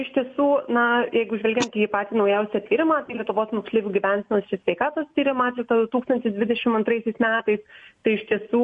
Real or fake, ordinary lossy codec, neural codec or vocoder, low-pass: real; MP3, 48 kbps; none; 10.8 kHz